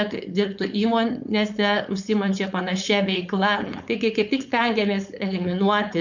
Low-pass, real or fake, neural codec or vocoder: 7.2 kHz; fake; codec, 16 kHz, 4.8 kbps, FACodec